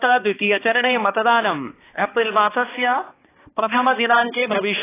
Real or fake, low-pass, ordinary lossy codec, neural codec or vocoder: fake; 3.6 kHz; AAC, 16 kbps; codec, 16 kHz, 2 kbps, X-Codec, HuBERT features, trained on balanced general audio